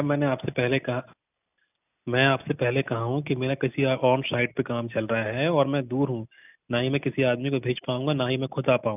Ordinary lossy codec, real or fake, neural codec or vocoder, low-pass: none; real; none; 3.6 kHz